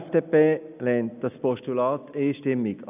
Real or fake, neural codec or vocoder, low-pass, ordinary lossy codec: real; none; 3.6 kHz; none